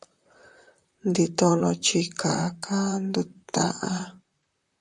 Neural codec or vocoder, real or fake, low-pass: vocoder, 44.1 kHz, 128 mel bands, Pupu-Vocoder; fake; 10.8 kHz